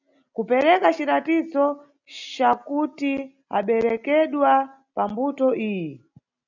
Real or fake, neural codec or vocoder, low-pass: real; none; 7.2 kHz